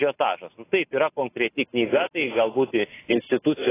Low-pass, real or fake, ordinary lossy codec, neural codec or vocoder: 3.6 kHz; real; AAC, 16 kbps; none